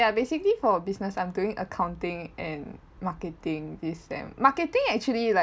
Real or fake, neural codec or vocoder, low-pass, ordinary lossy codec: real; none; none; none